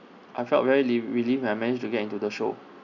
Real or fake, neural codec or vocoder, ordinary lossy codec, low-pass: real; none; none; 7.2 kHz